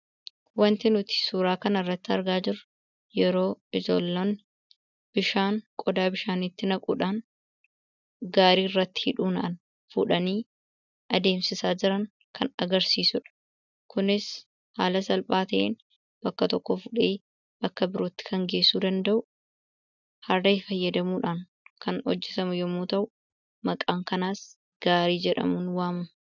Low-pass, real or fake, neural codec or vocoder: 7.2 kHz; real; none